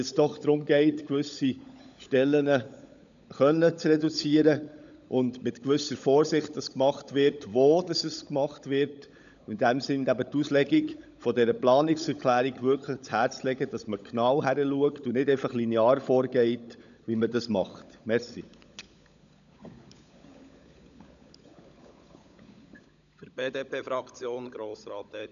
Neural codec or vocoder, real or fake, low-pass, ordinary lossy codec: codec, 16 kHz, 16 kbps, FunCodec, trained on LibriTTS, 50 frames a second; fake; 7.2 kHz; none